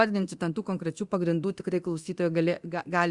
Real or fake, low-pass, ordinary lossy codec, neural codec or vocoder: fake; 10.8 kHz; Opus, 64 kbps; codec, 24 kHz, 0.9 kbps, DualCodec